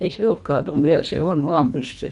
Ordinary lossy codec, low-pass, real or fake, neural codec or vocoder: none; 10.8 kHz; fake; codec, 24 kHz, 1.5 kbps, HILCodec